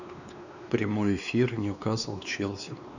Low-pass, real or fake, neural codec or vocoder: 7.2 kHz; fake; codec, 16 kHz, 2 kbps, X-Codec, WavLM features, trained on Multilingual LibriSpeech